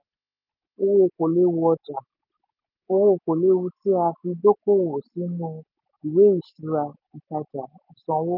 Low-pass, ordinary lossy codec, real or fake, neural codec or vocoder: 5.4 kHz; none; real; none